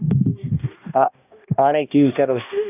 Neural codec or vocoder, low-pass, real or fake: codec, 16 kHz, 1 kbps, X-Codec, HuBERT features, trained on balanced general audio; 3.6 kHz; fake